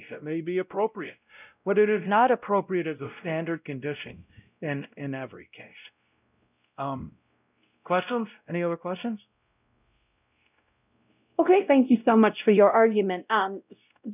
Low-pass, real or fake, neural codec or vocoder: 3.6 kHz; fake; codec, 16 kHz, 0.5 kbps, X-Codec, WavLM features, trained on Multilingual LibriSpeech